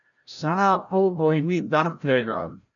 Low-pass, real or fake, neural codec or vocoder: 7.2 kHz; fake; codec, 16 kHz, 0.5 kbps, FreqCodec, larger model